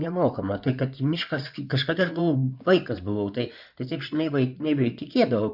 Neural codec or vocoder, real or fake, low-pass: codec, 16 kHz in and 24 kHz out, 2.2 kbps, FireRedTTS-2 codec; fake; 5.4 kHz